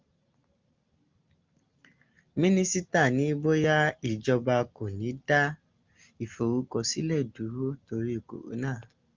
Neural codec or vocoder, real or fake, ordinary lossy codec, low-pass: none; real; Opus, 24 kbps; 7.2 kHz